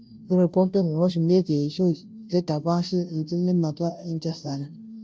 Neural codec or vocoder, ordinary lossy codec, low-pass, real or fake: codec, 16 kHz, 0.5 kbps, FunCodec, trained on Chinese and English, 25 frames a second; none; none; fake